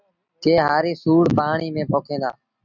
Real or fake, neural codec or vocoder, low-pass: real; none; 7.2 kHz